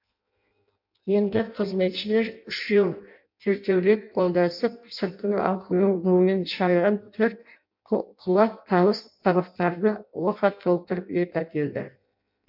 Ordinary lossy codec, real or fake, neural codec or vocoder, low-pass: MP3, 48 kbps; fake; codec, 16 kHz in and 24 kHz out, 0.6 kbps, FireRedTTS-2 codec; 5.4 kHz